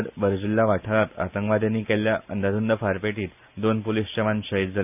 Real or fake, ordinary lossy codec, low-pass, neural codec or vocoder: real; none; 3.6 kHz; none